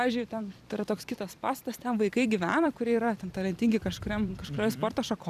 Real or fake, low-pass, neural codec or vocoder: fake; 14.4 kHz; vocoder, 44.1 kHz, 128 mel bands every 256 samples, BigVGAN v2